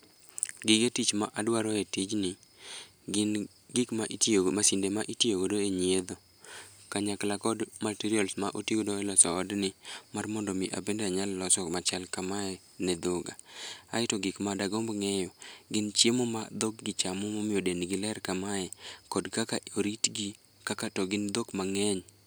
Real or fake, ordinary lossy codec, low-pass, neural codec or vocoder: real; none; none; none